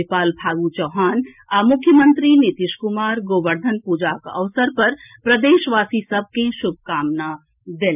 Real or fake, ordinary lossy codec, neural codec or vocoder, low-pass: real; none; none; 3.6 kHz